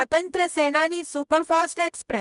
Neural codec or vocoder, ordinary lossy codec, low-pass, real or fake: codec, 24 kHz, 0.9 kbps, WavTokenizer, medium music audio release; none; 10.8 kHz; fake